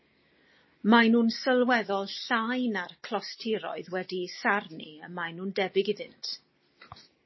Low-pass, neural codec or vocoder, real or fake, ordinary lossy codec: 7.2 kHz; none; real; MP3, 24 kbps